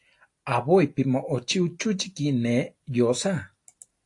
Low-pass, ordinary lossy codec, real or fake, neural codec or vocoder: 10.8 kHz; AAC, 48 kbps; real; none